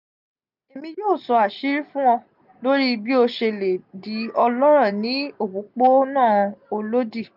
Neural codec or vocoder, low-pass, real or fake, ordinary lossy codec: none; 5.4 kHz; real; none